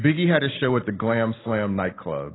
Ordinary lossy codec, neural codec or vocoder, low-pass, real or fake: AAC, 16 kbps; none; 7.2 kHz; real